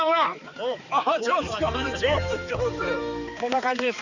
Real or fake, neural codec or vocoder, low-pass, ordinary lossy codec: fake; codec, 16 kHz, 4 kbps, X-Codec, HuBERT features, trained on balanced general audio; 7.2 kHz; none